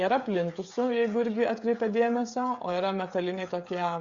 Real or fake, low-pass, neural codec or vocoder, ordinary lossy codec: fake; 7.2 kHz; codec, 16 kHz, 16 kbps, FreqCodec, smaller model; Opus, 64 kbps